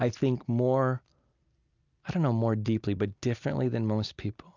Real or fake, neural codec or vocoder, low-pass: real; none; 7.2 kHz